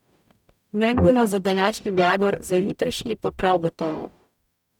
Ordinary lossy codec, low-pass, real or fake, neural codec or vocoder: none; 19.8 kHz; fake; codec, 44.1 kHz, 0.9 kbps, DAC